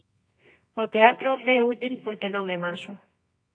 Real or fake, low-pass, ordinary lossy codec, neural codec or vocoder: fake; 9.9 kHz; AAC, 48 kbps; codec, 24 kHz, 0.9 kbps, WavTokenizer, medium music audio release